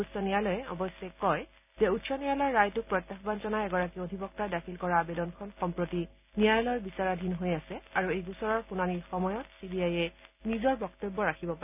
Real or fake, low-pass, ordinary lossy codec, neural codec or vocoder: real; 3.6 kHz; none; none